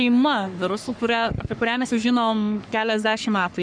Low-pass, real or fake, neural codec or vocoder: 9.9 kHz; fake; codec, 44.1 kHz, 3.4 kbps, Pupu-Codec